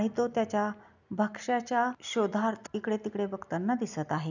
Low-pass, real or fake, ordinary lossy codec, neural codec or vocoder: 7.2 kHz; real; none; none